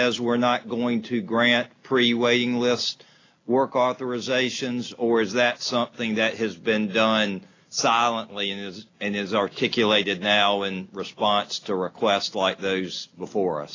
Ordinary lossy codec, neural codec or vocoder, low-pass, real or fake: AAC, 32 kbps; none; 7.2 kHz; real